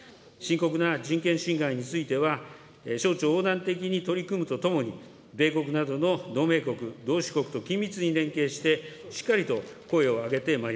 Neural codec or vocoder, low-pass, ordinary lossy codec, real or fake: none; none; none; real